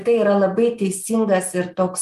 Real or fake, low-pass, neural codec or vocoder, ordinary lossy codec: real; 14.4 kHz; none; Opus, 32 kbps